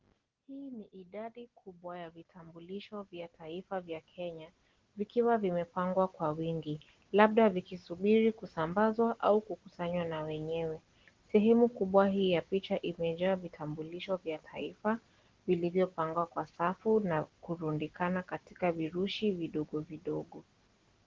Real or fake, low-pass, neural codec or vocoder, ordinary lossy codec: real; 7.2 kHz; none; Opus, 16 kbps